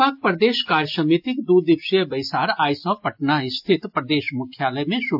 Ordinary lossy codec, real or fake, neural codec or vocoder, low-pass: AAC, 48 kbps; real; none; 5.4 kHz